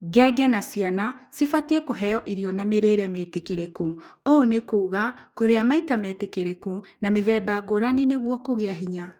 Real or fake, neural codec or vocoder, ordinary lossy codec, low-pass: fake; codec, 44.1 kHz, 2.6 kbps, DAC; none; 19.8 kHz